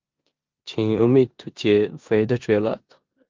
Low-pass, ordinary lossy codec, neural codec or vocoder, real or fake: 7.2 kHz; Opus, 24 kbps; codec, 16 kHz in and 24 kHz out, 0.9 kbps, LongCat-Audio-Codec, four codebook decoder; fake